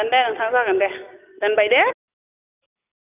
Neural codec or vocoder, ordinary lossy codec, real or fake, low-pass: none; none; real; 3.6 kHz